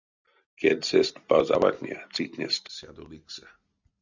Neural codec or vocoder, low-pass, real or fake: none; 7.2 kHz; real